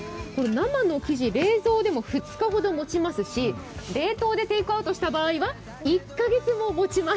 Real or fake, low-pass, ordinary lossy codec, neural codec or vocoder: real; none; none; none